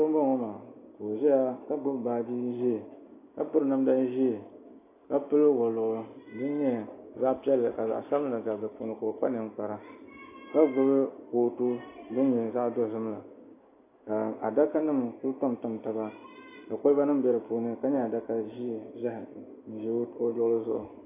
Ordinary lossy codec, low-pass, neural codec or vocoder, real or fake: AAC, 24 kbps; 3.6 kHz; none; real